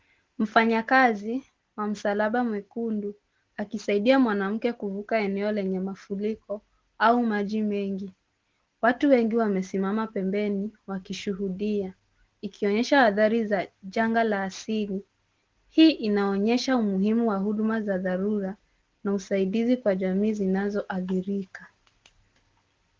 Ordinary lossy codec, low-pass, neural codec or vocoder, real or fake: Opus, 16 kbps; 7.2 kHz; none; real